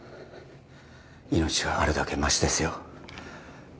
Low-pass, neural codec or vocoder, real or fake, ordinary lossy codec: none; none; real; none